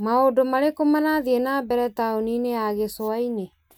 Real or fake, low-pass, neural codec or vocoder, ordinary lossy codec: real; none; none; none